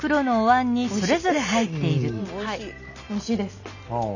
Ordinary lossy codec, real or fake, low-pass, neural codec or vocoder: none; real; 7.2 kHz; none